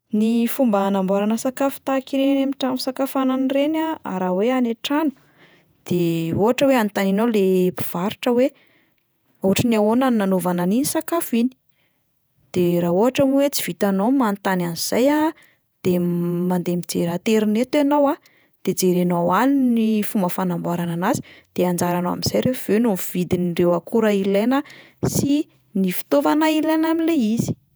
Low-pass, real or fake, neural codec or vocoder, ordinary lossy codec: none; fake; vocoder, 48 kHz, 128 mel bands, Vocos; none